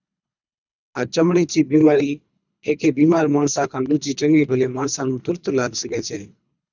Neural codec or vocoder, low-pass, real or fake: codec, 24 kHz, 3 kbps, HILCodec; 7.2 kHz; fake